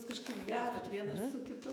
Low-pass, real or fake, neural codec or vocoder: 19.8 kHz; fake; autoencoder, 48 kHz, 128 numbers a frame, DAC-VAE, trained on Japanese speech